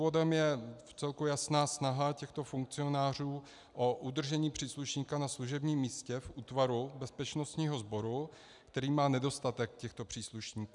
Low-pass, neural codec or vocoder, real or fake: 10.8 kHz; none; real